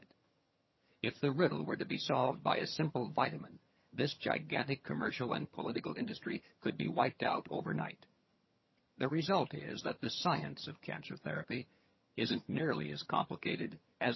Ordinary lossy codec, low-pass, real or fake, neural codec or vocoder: MP3, 24 kbps; 7.2 kHz; fake; vocoder, 22.05 kHz, 80 mel bands, HiFi-GAN